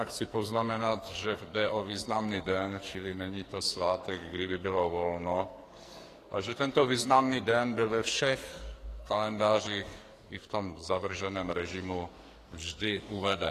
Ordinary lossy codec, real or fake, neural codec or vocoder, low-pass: AAC, 48 kbps; fake; codec, 44.1 kHz, 2.6 kbps, SNAC; 14.4 kHz